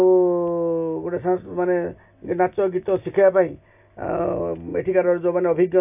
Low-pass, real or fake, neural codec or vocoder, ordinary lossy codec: 3.6 kHz; real; none; AAC, 32 kbps